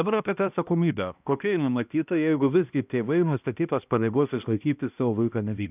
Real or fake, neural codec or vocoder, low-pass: fake; codec, 16 kHz, 1 kbps, X-Codec, HuBERT features, trained on balanced general audio; 3.6 kHz